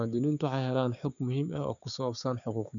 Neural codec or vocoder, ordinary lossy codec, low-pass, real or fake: codec, 16 kHz, 6 kbps, DAC; none; 7.2 kHz; fake